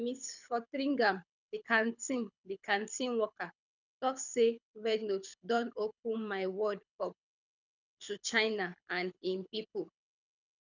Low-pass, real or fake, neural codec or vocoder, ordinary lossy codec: 7.2 kHz; fake; codec, 24 kHz, 6 kbps, HILCodec; none